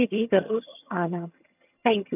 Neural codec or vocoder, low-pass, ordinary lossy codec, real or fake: vocoder, 22.05 kHz, 80 mel bands, HiFi-GAN; 3.6 kHz; none; fake